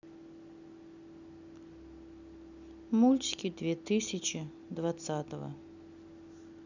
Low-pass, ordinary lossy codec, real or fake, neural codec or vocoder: 7.2 kHz; none; real; none